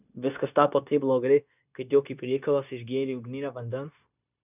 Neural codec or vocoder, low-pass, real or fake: codec, 16 kHz, 0.9 kbps, LongCat-Audio-Codec; 3.6 kHz; fake